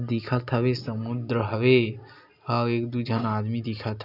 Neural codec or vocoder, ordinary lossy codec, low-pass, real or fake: none; none; 5.4 kHz; real